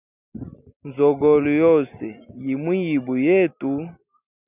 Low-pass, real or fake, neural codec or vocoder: 3.6 kHz; real; none